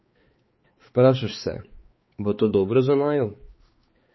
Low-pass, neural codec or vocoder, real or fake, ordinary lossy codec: 7.2 kHz; codec, 16 kHz, 4 kbps, X-Codec, HuBERT features, trained on balanced general audio; fake; MP3, 24 kbps